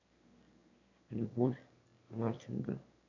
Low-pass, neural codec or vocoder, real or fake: 7.2 kHz; autoencoder, 22.05 kHz, a latent of 192 numbers a frame, VITS, trained on one speaker; fake